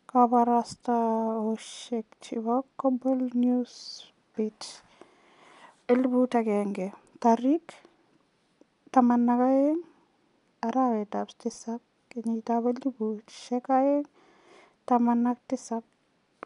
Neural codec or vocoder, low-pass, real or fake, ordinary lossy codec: none; 10.8 kHz; real; none